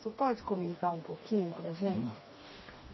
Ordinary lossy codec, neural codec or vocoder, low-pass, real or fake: MP3, 24 kbps; codec, 16 kHz, 2 kbps, FreqCodec, smaller model; 7.2 kHz; fake